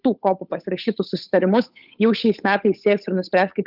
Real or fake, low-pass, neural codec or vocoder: fake; 5.4 kHz; codec, 16 kHz, 8 kbps, FunCodec, trained on Chinese and English, 25 frames a second